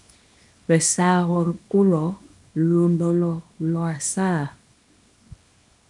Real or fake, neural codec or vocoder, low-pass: fake; codec, 24 kHz, 0.9 kbps, WavTokenizer, small release; 10.8 kHz